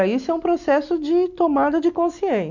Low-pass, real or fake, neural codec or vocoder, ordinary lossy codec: 7.2 kHz; real; none; none